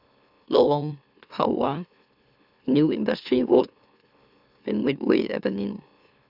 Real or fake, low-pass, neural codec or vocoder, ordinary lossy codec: fake; 5.4 kHz; autoencoder, 44.1 kHz, a latent of 192 numbers a frame, MeloTTS; none